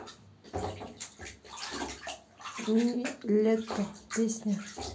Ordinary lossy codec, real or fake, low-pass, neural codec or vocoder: none; real; none; none